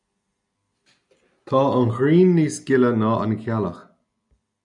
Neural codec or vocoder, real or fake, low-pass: none; real; 10.8 kHz